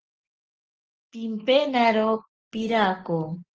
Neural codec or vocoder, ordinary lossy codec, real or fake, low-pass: none; Opus, 16 kbps; real; 7.2 kHz